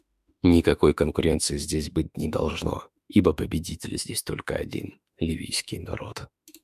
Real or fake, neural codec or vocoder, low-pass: fake; autoencoder, 48 kHz, 32 numbers a frame, DAC-VAE, trained on Japanese speech; 14.4 kHz